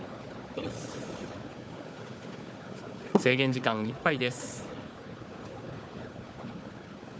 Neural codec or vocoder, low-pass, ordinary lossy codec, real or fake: codec, 16 kHz, 4 kbps, FunCodec, trained on Chinese and English, 50 frames a second; none; none; fake